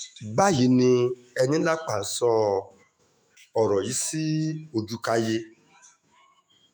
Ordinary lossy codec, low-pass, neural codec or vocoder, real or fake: none; none; autoencoder, 48 kHz, 128 numbers a frame, DAC-VAE, trained on Japanese speech; fake